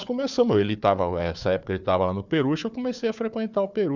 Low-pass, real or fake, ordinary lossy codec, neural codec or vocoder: 7.2 kHz; fake; none; codec, 16 kHz, 4 kbps, FunCodec, trained on Chinese and English, 50 frames a second